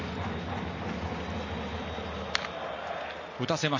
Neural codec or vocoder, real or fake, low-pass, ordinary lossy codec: none; real; 7.2 kHz; MP3, 32 kbps